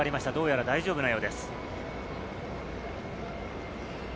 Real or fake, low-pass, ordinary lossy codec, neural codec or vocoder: real; none; none; none